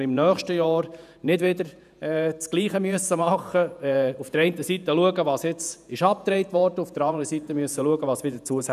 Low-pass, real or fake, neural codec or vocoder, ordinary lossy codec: 14.4 kHz; fake; vocoder, 48 kHz, 128 mel bands, Vocos; none